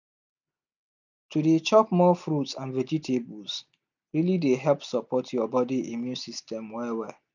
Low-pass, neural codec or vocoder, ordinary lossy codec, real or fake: 7.2 kHz; none; none; real